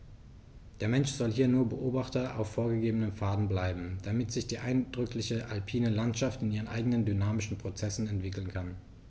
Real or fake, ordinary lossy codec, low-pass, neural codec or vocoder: real; none; none; none